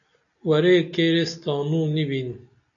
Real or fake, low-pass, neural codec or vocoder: real; 7.2 kHz; none